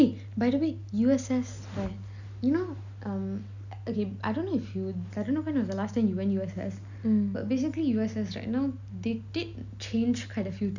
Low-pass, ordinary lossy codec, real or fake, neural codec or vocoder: 7.2 kHz; none; real; none